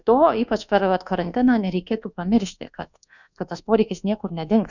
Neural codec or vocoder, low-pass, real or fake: codec, 24 kHz, 1.2 kbps, DualCodec; 7.2 kHz; fake